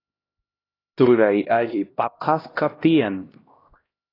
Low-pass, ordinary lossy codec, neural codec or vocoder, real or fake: 5.4 kHz; AAC, 24 kbps; codec, 16 kHz, 1 kbps, X-Codec, HuBERT features, trained on LibriSpeech; fake